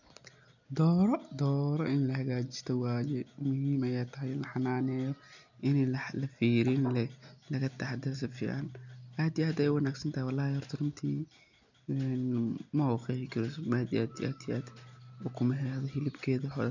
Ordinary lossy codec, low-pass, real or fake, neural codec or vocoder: none; 7.2 kHz; real; none